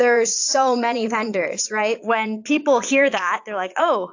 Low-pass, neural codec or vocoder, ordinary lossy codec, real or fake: 7.2 kHz; vocoder, 22.05 kHz, 80 mel bands, Vocos; AAC, 48 kbps; fake